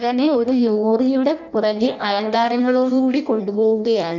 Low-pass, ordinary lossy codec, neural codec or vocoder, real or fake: 7.2 kHz; none; codec, 16 kHz in and 24 kHz out, 0.6 kbps, FireRedTTS-2 codec; fake